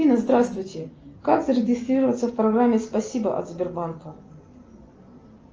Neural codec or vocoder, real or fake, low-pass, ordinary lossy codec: none; real; 7.2 kHz; Opus, 24 kbps